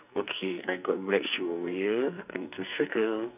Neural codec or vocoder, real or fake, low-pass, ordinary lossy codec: codec, 44.1 kHz, 2.6 kbps, SNAC; fake; 3.6 kHz; none